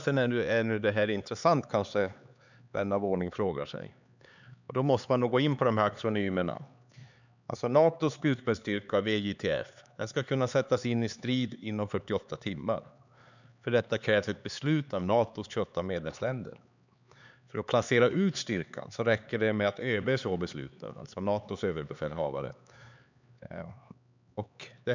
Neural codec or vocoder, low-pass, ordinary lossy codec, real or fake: codec, 16 kHz, 4 kbps, X-Codec, HuBERT features, trained on LibriSpeech; 7.2 kHz; none; fake